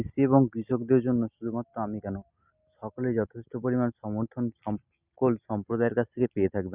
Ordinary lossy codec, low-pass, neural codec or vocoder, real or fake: Opus, 64 kbps; 3.6 kHz; none; real